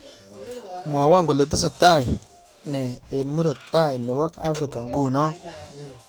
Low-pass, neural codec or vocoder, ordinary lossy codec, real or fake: none; codec, 44.1 kHz, 2.6 kbps, DAC; none; fake